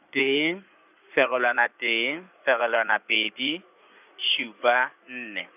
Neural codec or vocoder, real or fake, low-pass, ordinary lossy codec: codec, 16 kHz in and 24 kHz out, 2.2 kbps, FireRedTTS-2 codec; fake; 3.6 kHz; none